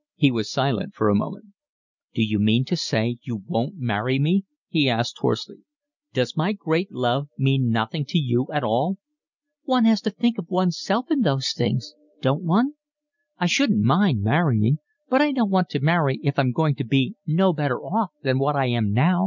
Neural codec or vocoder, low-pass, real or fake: none; 7.2 kHz; real